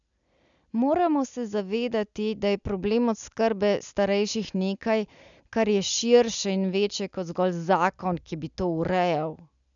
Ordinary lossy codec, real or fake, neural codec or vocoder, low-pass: none; real; none; 7.2 kHz